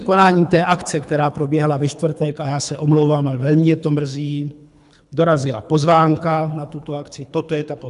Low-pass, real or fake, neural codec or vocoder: 10.8 kHz; fake; codec, 24 kHz, 3 kbps, HILCodec